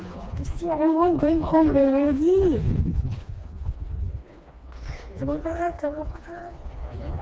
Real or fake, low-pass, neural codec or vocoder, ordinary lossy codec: fake; none; codec, 16 kHz, 2 kbps, FreqCodec, smaller model; none